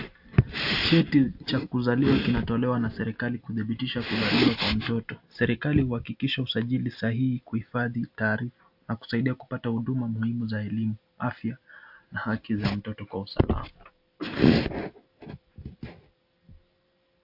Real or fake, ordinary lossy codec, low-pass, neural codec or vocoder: real; AAC, 48 kbps; 5.4 kHz; none